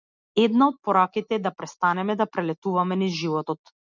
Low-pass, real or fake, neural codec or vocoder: 7.2 kHz; real; none